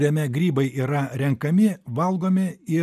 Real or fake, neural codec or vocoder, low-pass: real; none; 14.4 kHz